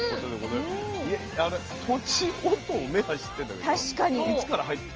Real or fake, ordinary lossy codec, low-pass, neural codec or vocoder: real; Opus, 24 kbps; 7.2 kHz; none